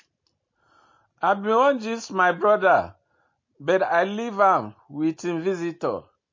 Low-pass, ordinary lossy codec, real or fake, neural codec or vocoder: 7.2 kHz; MP3, 32 kbps; real; none